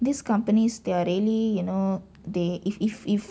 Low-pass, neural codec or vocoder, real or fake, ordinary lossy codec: none; none; real; none